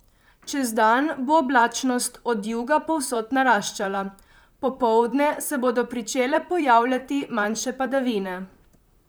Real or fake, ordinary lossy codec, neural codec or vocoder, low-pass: fake; none; vocoder, 44.1 kHz, 128 mel bands, Pupu-Vocoder; none